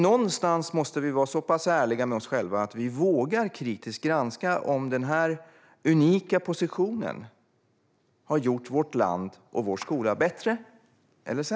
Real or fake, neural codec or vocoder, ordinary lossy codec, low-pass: real; none; none; none